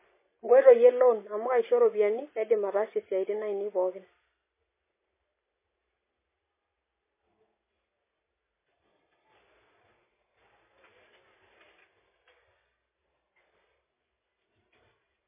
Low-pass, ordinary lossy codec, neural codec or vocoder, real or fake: 3.6 kHz; MP3, 16 kbps; none; real